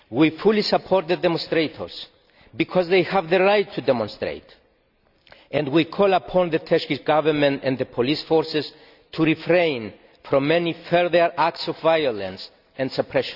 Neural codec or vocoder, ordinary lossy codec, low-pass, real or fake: none; none; 5.4 kHz; real